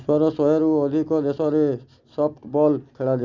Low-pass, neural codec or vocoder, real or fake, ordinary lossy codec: 7.2 kHz; none; real; none